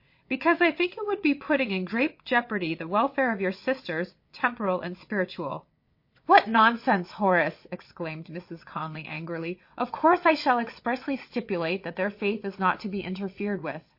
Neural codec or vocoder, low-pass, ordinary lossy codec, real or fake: vocoder, 22.05 kHz, 80 mel bands, WaveNeXt; 5.4 kHz; MP3, 32 kbps; fake